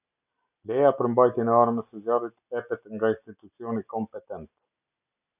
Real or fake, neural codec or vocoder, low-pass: real; none; 3.6 kHz